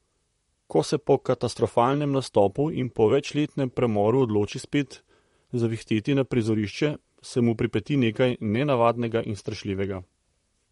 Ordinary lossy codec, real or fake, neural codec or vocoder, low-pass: MP3, 48 kbps; fake; vocoder, 44.1 kHz, 128 mel bands, Pupu-Vocoder; 19.8 kHz